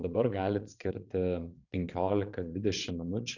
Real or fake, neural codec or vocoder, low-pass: fake; vocoder, 22.05 kHz, 80 mel bands, Vocos; 7.2 kHz